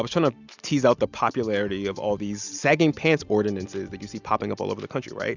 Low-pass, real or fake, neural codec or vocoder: 7.2 kHz; real; none